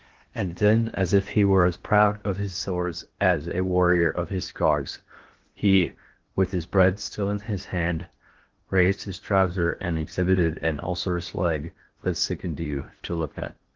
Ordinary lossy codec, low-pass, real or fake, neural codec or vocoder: Opus, 16 kbps; 7.2 kHz; fake; codec, 16 kHz in and 24 kHz out, 0.8 kbps, FocalCodec, streaming, 65536 codes